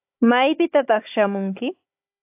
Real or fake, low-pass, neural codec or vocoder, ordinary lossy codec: fake; 3.6 kHz; codec, 16 kHz, 16 kbps, FunCodec, trained on Chinese and English, 50 frames a second; AAC, 32 kbps